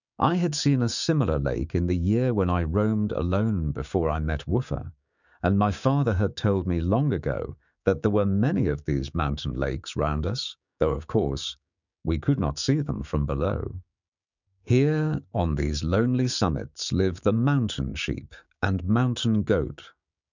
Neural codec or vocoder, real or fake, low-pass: codec, 16 kHz, 6 kbps, DAC; fake; 7.2 kHz